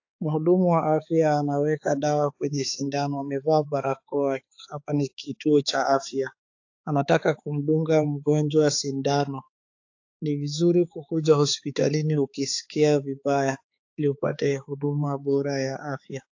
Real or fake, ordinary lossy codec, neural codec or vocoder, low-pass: fake; AAC, 48 kbps; codec, 16 kHz, 4 kbps, X-Codec, HuBERT features, trained on balanced general audio; 7.2 kHz